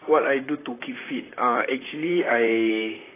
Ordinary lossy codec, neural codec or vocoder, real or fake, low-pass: AAC, 16 kbps; vocoder, 44.1 kHz, 128 mel bands, Pupu-Vocoder; fake; 3.6 kHz